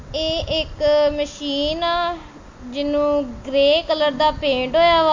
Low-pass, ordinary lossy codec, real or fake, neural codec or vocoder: 7.2 kHz; MP3, 48 kbps; real; none